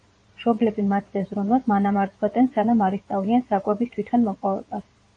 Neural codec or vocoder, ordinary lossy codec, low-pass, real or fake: vocoder, 22.05 kHz, 80 mel bands, Vocos; AAC, 48 kbps; 9.9 kHz; fake